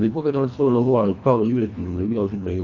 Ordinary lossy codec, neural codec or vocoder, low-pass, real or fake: none; codec, 24 kHz, 1.5 kbps, HILCodec; 7.2 kHz; fake